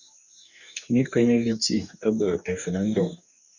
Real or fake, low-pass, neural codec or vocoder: fake; 7.2 kHz; codec, 44.1 kHz, 2.6 kbps, DAC